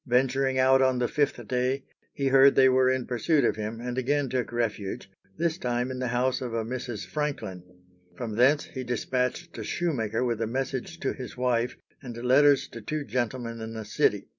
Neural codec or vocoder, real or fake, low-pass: none; real; 7.2 kHz